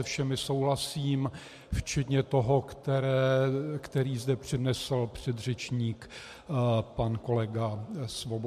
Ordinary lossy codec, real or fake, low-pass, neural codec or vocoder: MP3, 64 kbps; real; 14.4 kHz; none